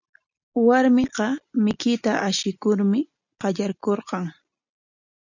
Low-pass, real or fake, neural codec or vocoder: 7.2 kHz; real; none